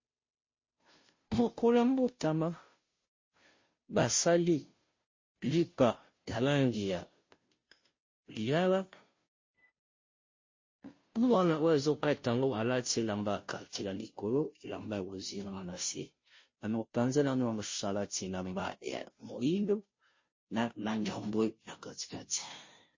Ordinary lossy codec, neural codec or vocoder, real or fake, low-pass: MP3, 32 kbps; codec, 16 kHz, 0.5 kbps, FunCodec, trained on Chinese and English, 25 frames a second; fake; 7.2 kHz